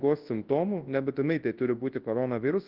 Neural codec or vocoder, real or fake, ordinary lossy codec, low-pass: codec, 24 kHz, 0.9 kbps, WavTokenizer, large speech release; fake; Opus, 16 kbps; 5.4 kHz